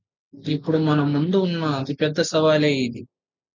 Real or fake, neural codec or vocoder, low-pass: real; none; 7.2 kHz